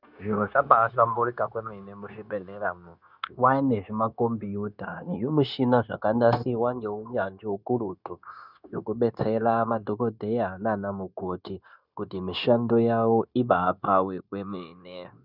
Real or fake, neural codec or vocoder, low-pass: fake; codec, 16 kHz, 0.9 kbps, LongCat-Audio-Codec; 5.4 kHz